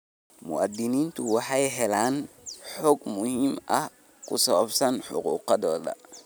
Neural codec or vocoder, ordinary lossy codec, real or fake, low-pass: none; none; real; none